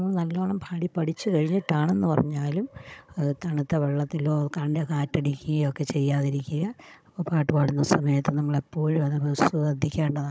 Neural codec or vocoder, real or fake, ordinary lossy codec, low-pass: codec, 16 kHz, 16 kbps, FunCodec, trained on Chinese and English, 50 frames a second; fake; none; none